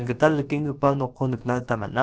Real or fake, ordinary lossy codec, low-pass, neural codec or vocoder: fake; none; none; codec, 16 kHz, about 1 kbps, DyCAST, with the encoder's durations